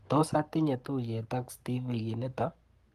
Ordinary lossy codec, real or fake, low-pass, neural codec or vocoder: Opus, 24 kbps; fake; 14.4 kHz; codec, 44.1 kHz, 7.8 kbps, Pupu-Codec